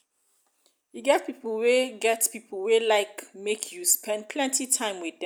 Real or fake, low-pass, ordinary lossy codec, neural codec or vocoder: real; none; none; none